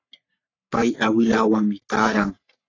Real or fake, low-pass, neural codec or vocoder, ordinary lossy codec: fake; 7.2 kHz; codec, 44.1 kHz, 3.4 kbps, Pupu-Codec; AAC, 32 kbps